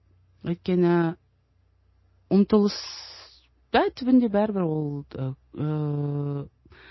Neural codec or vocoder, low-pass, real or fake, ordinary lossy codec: none; 7.2 kHz; real; MP3, 24 kbps